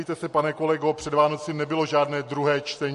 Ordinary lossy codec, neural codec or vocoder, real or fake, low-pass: MP3, 48 kbps; none; real; 14.4 kHz